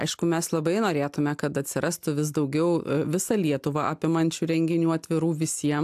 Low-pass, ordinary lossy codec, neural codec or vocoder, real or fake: 14.4 kHz; AAC, 96 kbps; none; real